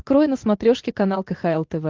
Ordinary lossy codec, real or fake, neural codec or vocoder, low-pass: Opus, 24 kbps; real; none; 7.2 kHz